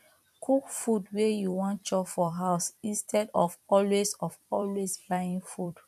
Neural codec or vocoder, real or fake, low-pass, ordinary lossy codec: vocoder, 44.1 kHz, 128 mel bands every 256 samples, BigVGAN v2; fake; 14.4 kHz; none